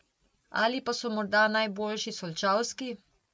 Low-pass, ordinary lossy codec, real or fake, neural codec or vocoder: none; none; real; none